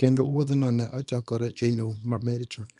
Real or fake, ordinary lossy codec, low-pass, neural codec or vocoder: fake; none; 10.8 kHz; codec, 24 kHz, 0.9 kbps, WavTokenizer, small release